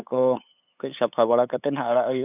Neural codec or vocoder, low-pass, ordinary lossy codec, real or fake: codec, 16 kHz, 8 kbps, FunCodec, trained on LibriTTS, 25 frames a second; 3.6 kHz; none; fake